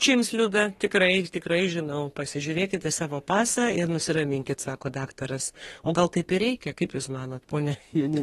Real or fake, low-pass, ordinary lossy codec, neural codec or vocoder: fake; 14.4 kHz; AAC, 32 kbps; codec, 32 kHz, 1.9 kbps, SNAC